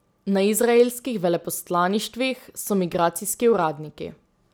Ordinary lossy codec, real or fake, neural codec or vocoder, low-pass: none; real; none; none